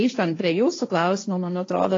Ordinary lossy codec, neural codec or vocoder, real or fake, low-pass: AAC, 32 kbps; codec, 16 kHz, 1.1 kbps, Voila-Tokenizer; fake; 7.2 kHz